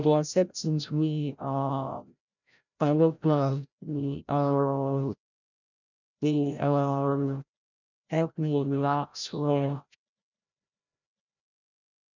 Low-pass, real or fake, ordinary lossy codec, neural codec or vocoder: 7.2 kHz; fake; none; codec, 16 kHz, 0.5 kbps, FreqCodec, larger model